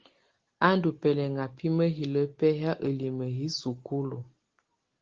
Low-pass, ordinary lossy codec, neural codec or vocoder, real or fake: 7.2 kHz; Opus, 16 kbps; none; real